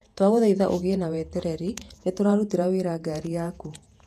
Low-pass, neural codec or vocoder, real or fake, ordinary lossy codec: 14.4 kHz; vocoder, 48 kHz, 128 mel bands, Vocos; fake; none